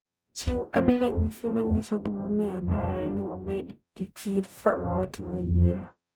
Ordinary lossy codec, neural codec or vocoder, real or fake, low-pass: none; codec, 44.1 kHz, 0.9 kbps, DAC; fake; none